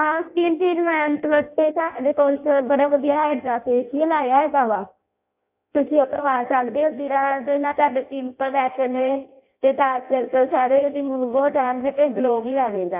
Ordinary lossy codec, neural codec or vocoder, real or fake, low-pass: AAC, 32 kbps; codec, 16 kHz in and 24 kHz out, 0.6 kbps, FireRedTTS-2 codec; fake; 3.6 kHz